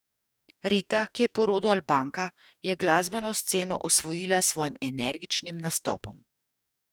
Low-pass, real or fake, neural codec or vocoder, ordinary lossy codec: none; fake; codec, 44.1 kHz, 2.6 kbps, DAC; none